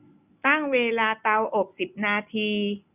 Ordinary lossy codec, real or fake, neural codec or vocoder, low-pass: none; real; none; 3.6 kHz